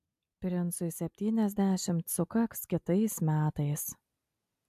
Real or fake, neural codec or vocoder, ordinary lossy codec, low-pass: real; none; AAC, 96 kbps; 14.4 kHz